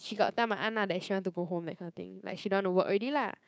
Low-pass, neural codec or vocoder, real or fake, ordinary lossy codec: none; codec, 16 kHz, 6 kbps, DAC; fake; none